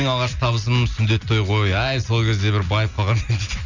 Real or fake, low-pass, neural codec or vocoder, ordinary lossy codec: real; 7.2 kHz; none; none